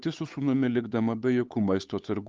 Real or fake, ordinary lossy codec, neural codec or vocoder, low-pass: fake; Opus, 32 kbps; codec, 16 kHz, 8 kbps, FunCodec, trained on Chinese and English, 25 frames a second; 7.2 kHz